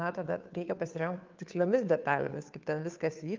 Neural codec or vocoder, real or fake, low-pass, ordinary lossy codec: codec, 16 kHz, 2 kbps, FunCodec, trained on Chinese and English, 25 frames a second; fake; 7.2 kHz; Opus, 24 kbps